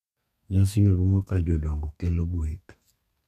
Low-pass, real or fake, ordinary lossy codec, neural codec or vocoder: 14.4 kHz; fake; none; codec, 32 kHz, 1.9 kbps, SNAC